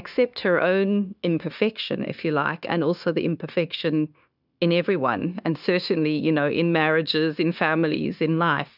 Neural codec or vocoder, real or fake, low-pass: codec, 16 kHz, 0.9 kbps, LongCat-Audio-Codec; fake; 5.4 kHz